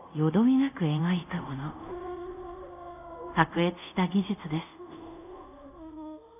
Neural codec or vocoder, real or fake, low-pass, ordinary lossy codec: codec, 24 kHz, 0.5 kbps, DualCodec; fake; 3.6 kHz; none